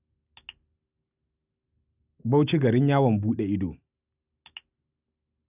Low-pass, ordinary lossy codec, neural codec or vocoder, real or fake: 3.6 kHz; none; none; real